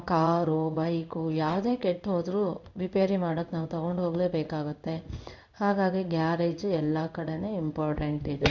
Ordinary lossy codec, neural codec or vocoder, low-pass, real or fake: none; codec, 16 kHz in and 24 kHz out, 1 kbps, XY-Tokenizer; 7.2 kHz; fake